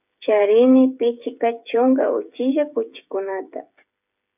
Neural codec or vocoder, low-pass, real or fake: codec, 16 kHz, 8 kbps, FreqCodec, smaller model; 3.6 kHz; fake